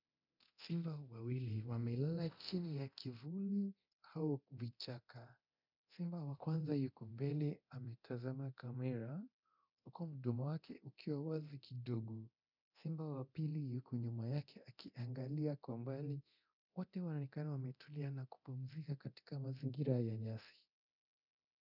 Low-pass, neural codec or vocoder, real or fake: 5.4 kHz; codec, 24 kHz, 0.9 kbps, DualCodec; fake